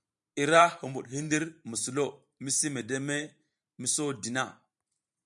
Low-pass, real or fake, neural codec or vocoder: 10.8 kHz; fake; vocoder, 44.1 kHz, 128 mel bands every 512 samples, BigVGAN v2